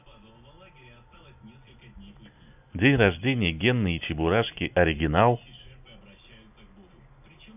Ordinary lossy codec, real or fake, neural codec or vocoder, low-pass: none; real; none; 3.6 kHz